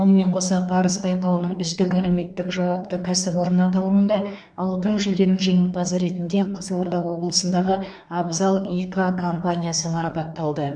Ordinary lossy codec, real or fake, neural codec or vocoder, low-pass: none; fake; codec, 24 kHz, 1 kbps, SNAC; 9.9 kHz